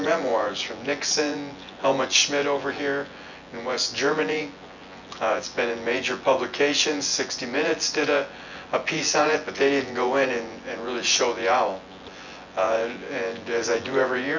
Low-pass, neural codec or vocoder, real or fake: 7.2 kHz; vocoder, 24 kHz, 100 mel bands, Vocos; fake